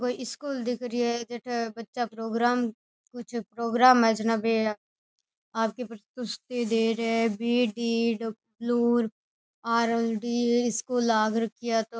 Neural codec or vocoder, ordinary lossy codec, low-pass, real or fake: none; none; none; real